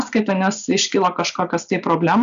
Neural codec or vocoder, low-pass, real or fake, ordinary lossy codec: none; 7.2 kHz; real; AAC, 96 kbps